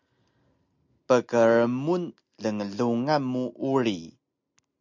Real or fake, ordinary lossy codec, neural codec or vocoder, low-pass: real; MP3, 64 kbps; none; 7.2 kHz